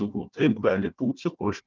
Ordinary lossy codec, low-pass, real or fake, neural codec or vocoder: Opus, 24 kbps; 7.2 kHz; fake; codec, 16 kHz, 1 kbps, FunCodec, trained on Chinese and English, 50 frames a second